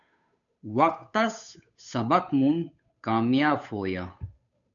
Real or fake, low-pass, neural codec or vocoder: fake; 7.2 kHz; codec, 16 kHz, 8 kbps, FunCodec, trained on Chinese and English, 25 frames a second